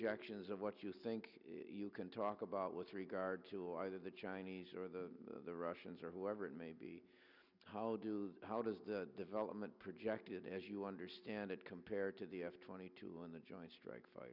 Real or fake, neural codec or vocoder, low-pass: real; none; 5.4 kHz